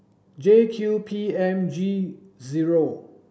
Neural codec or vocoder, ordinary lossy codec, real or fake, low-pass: none; none; real; none